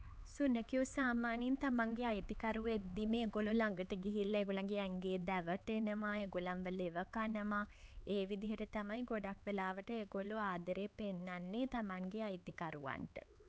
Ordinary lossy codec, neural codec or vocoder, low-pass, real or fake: none; codec, 16 kHz, 4 kbps, X-Codec, HuBERT features, trained on LibriSpeech; none; fake